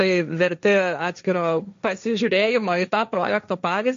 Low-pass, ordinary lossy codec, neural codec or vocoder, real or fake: 7.2 kHz; MP3, 48 kbps; codec, 16 kHz, 1.1 kbps, Voila-Tokenizer; fake